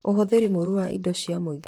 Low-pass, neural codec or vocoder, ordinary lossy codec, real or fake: 19.8 kHz; vocoder, 44.1 kHz, 128 mel bands, Pupu-Vocoder; none; fake